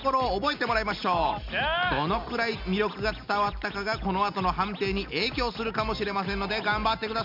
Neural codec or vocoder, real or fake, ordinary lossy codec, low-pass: none; real; MP3, 48 kbps; 5.4 kHz